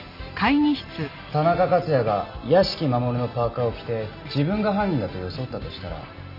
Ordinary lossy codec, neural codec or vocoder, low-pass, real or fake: none; none; 5.4 kHz; real